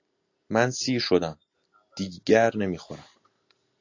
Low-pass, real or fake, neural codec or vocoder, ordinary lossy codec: 7.2 kHz; real; none; AAC, 48 kbps